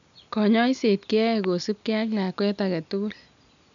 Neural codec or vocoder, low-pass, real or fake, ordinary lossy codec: none; 7.2 kHz; real; none